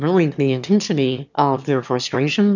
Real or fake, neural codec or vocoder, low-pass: fake; autoencoder, 22.05 kHz, a latent of 192 numbers a frame, VITS, trained on one speaker; 7.2 kHz